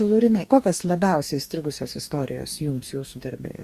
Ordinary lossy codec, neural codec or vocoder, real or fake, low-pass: Opus, 64 kbps; codec, 44.1 kHz, 2.6 kbps, DAC; fake; 14.4 kHz